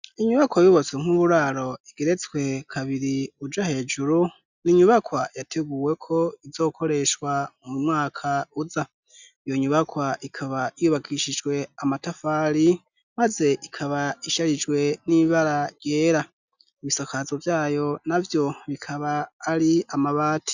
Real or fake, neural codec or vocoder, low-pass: real; none; 7.2 kHz